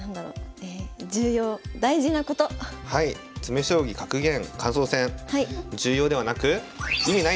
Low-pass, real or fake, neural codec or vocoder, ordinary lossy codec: none; real; none; none